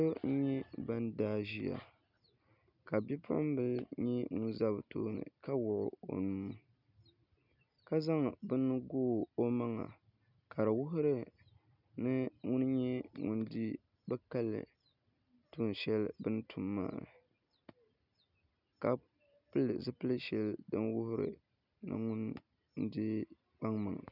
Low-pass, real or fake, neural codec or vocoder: 5.4 kHz; real; none